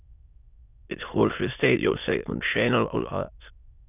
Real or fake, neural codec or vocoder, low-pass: fake; autoencoder, 22.05 kHz, a latent of 192 numbers a frame, VITS, trained on many speakers; 3.6 kHz